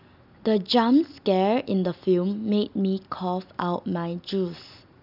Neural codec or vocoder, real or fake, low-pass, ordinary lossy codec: none; real; 5.4 kHz; none